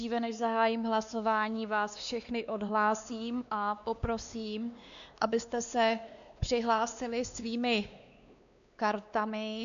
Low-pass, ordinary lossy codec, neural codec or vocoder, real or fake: 7.2 kHz; MP3, 96 kbps; codec, 16 kHz, 2 kbps, X-Codec, WavLM features, trained on Multilingual LibriSpeech; fake